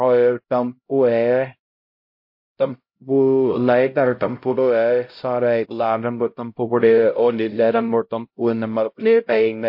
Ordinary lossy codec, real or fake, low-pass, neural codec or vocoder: MP3, 32 kbps; fake; 5.4 kHz; codec, 16 kHz, 0.5 kbps, X-Codec, HuBERT features, trained on LibriSpeech